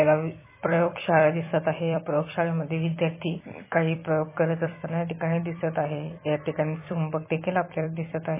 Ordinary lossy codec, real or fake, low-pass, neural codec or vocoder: MP3, 16 kbps; fake; 3.6 kHz; vocoder, 44.1 kHz, 128 mel bands every 256 samples, BigVGAN v2